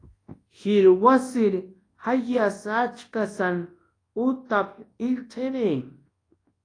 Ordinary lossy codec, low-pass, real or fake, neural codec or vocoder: AAC, 32 kbps; 9.9 kHz; fake; codec, 24 kHz, 0.9 kbps, WavTokenizer, large speech release